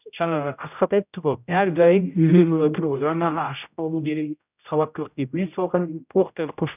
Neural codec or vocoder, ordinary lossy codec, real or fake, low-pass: codec, 16 kHz, 0.5 kbps, X-Codec, HuBERT features, trained on general audio; none; fake; 3.6 kHz